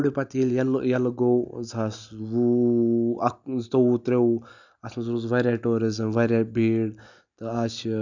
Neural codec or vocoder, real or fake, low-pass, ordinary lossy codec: none; real; 7.2 kHz; none